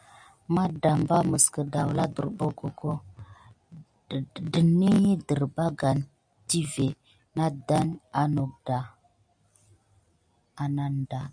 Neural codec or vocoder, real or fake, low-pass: none; real; 9.9 kHz